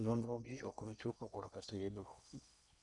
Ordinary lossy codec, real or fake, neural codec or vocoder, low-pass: none; fake; codec, 16 kHz in and 24 kHz out, 0.8 kbps, FocalCodec, streaming, 65536 codes; 10.8 kHz